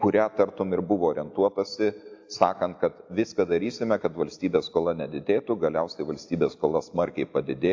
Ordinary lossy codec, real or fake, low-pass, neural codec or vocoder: AAC, 48 kbps; real; 7.2 kHz; none